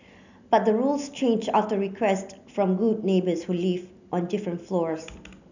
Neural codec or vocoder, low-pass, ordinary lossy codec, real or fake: none; 7.2 kHz; none; real